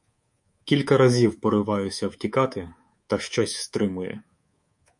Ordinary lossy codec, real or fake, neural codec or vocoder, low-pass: MP3, 48 kbps; fake; codec, 24 kHz, 3.1 kbps, DualCodec; 10.8 kHz